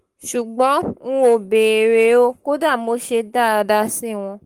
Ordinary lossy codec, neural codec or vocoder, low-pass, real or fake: Opus, 24 kbps; codec, 44.1 kHz, 7.8 kbps, Pupu-Codec; 19.8 kHz; fake